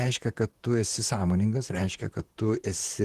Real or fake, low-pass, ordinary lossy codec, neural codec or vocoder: fake; 14.4 kHz; Opus, 16 kbps; vocoder, 44.1 kHz, 128 mel bands, Pupu-Vocoder